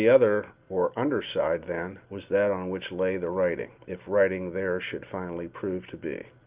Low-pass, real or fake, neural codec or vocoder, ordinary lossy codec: 3.6 kHz; real; none; Opus, 32 kbps